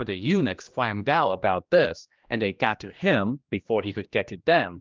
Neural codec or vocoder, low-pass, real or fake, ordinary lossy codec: codec, 16 kHz, 1 kbps, X-Codec, HuBERT features, trained on general audio; 7.2 kHz; fake; Opus, 24 kbps